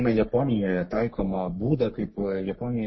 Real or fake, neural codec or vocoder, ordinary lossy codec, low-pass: fake; codec, 44.1 kHz, 3.4 kbps, Pupu-Codec; MP3, 24 kbps; 7.2 kHz